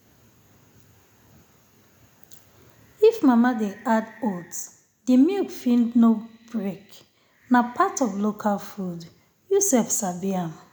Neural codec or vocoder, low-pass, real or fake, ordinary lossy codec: none; none; real; none